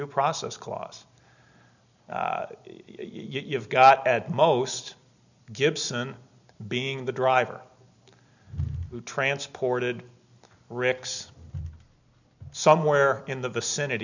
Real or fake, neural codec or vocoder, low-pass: real; none; 7.2 kHz